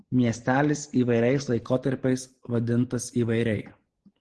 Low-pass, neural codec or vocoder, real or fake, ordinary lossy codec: 10.8 kHz; none; real; Opus, 16 kbps